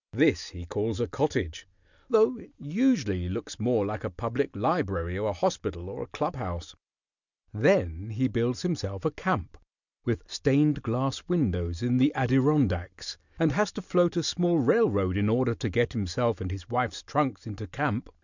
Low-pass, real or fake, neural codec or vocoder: 7.2 kHz; real; none